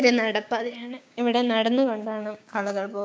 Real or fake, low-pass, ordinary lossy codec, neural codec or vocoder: fake; none; none; codec, 16 kHz, 6 kbps, DAC